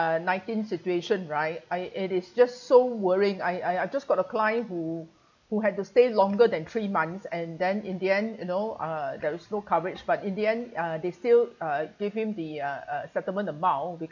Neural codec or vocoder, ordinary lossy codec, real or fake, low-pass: none; none; real; 7.2 kHz